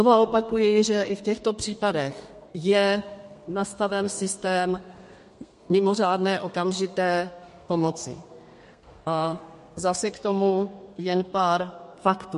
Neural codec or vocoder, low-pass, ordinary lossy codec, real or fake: codec, 32 kHz, 1.9 kbps, SNAC; 14.4 kHz; MP3, 48 kbps; fake